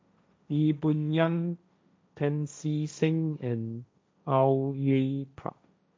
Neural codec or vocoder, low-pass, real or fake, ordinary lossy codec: codec, 16 kHz, 1.1 kbps, Voila-Tokenizer; none; fake; none